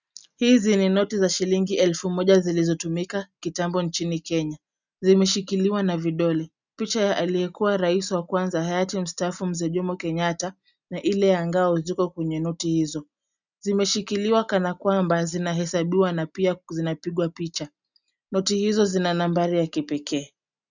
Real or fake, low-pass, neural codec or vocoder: real; 7.2 kHz; none